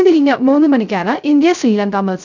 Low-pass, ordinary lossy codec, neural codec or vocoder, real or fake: 7.2 kHz; none; codec, 16 kHz, 0.3 kbps, FocalCodec; fake